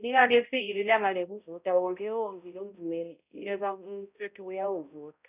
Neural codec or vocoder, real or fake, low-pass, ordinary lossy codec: codec, 16 kHz, 0.5 kbps, X-Codec, HuBERT features, trained on balanced general audio; fake; 3.6 kHz; none